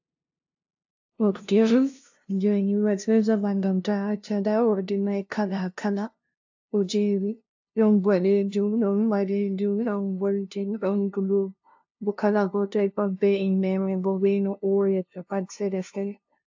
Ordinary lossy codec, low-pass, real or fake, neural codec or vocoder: AAC, 48 kbps; 7.2 kHz; fake; codec, 16 kHz, 0.5 kbps, FunCodec, trained on LibriTTS, 25 frames a second